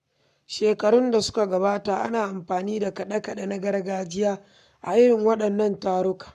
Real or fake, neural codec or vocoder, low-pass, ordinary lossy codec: fake; codec, 44.1 kHz, 7.8 kbps, Pupu-Codec; 14.4 kHz; none